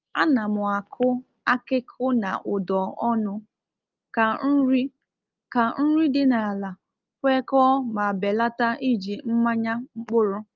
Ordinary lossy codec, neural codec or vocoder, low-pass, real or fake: Opus, 32 kbps; none; 7.2 kHz; real